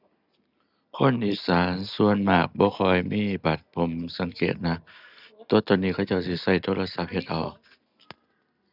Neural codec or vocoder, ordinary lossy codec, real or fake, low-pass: none; none; real; 5.4 kHz